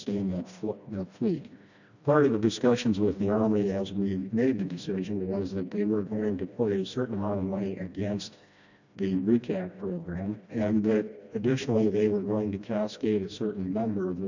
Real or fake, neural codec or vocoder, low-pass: fake; codec, 16 kHz, 1 kbps, FreqCodec, smaller model; 7.2 kHz